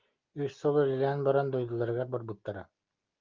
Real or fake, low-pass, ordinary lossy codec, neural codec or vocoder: real; 7.2 kHz; Opus, 32 kbps; none